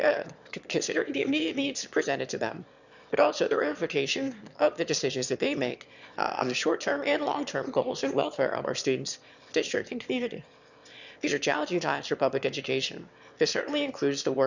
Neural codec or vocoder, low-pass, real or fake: autoencoder, 22.05 kHz, a latent of 192 numbers a frame, VITS, trained on one speaker; 7.2 kHz; fake